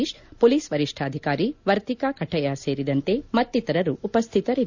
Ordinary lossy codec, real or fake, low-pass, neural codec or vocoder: none; real; 7.2 kHz; none